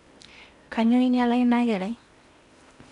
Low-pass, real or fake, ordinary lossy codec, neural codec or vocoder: 10.8 kHz; fake; none; codec, 16 kHz in and 24 kHz out, 0.8 kbps, FocalCodec, streaming, 65536 codes